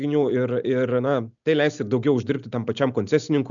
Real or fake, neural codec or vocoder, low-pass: real; none; 7.2 kHz